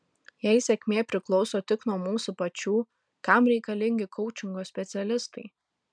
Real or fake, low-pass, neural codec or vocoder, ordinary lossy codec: real; 9.9 kHz; none; MP3, 96 kbps